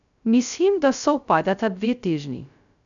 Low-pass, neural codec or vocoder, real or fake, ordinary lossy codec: 7.2 kHz; codec, 16 kHz, 0.2 kbps, FocalCodec; fake; none